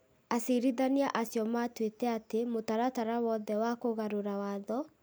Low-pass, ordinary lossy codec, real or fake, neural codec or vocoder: none; none; real; none